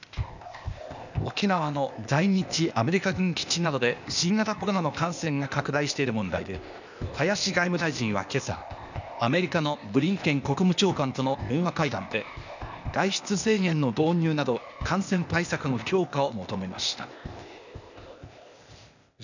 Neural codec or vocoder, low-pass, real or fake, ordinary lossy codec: codec, 16 kHz, 0.8 kbps, ZipCodec; 7.2 kHz; fake; none